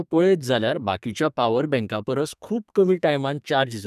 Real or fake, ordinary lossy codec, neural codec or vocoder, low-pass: fake; none; codec, 32 kHz, 1.9 kbps, SNAC; 14.4 kHz